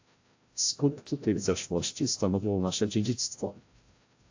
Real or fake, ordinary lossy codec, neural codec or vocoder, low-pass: fake; AAC, 48 kbps; codec, 16 kHz, 0.5 kbps, FreqCodec, larger model; 7.2 kHz